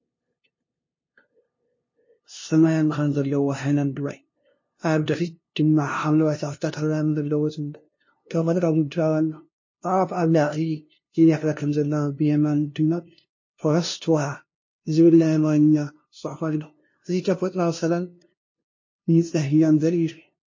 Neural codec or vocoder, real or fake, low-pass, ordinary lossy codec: codec, 16 kHz, 0.5 kbps, FunCodec, trained on LibriTTS, 25 frames a second; fake; 7.2 kHz; MP3, 32 kbps